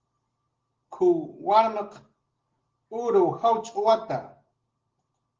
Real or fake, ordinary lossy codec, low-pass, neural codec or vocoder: real; Opus, 16 kbps; 7.2 kHz; none